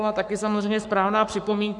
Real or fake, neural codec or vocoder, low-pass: fake; codec, 44.1 kHz, 7.8 kbps, Pupu-Codec; 10.8 kHz